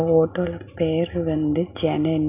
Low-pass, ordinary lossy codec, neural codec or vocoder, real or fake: 3.6 kHz; none; none; real